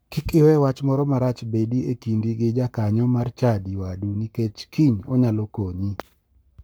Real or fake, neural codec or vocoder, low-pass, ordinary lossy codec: fake; codec, 44.1 kHz, 7.8 kbps, Pupu-Codec; none; none